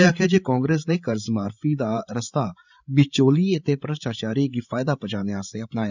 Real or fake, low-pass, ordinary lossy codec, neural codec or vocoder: fake; 7.2 kHz; none; codec, 16 kHz, 16 kbps, FreqCodec, larger model